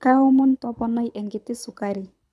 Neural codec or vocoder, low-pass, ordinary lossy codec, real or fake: codec, 24 kHz, 6 kbps, HILCodec; none; none; fake